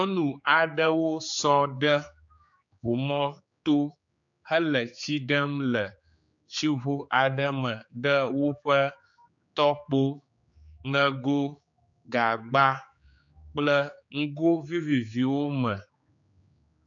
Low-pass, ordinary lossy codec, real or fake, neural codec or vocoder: 7.2 kHz; AAC, 64 kbps; fake; codec, 16 kHz, 4 kbps, X-Codec, HuBERT features, trained on general audio